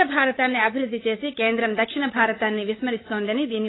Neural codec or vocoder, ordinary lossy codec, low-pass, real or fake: codec, 16 kHz, 8 kbps, FunCodec, trained on LibriTTS, 25 frames a second; AAC, 16 kbps; 7.2 kHz; fake